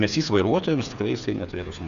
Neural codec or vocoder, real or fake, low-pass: codec, 16 kHz, 8 kbps, FreqCodec, smaller model; fake; 7.2 kHz